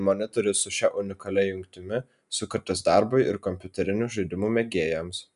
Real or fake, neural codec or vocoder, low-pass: real; none; 10.8 kHz